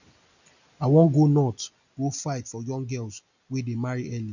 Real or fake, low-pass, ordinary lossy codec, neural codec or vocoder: real; 7.2 kHz; none; none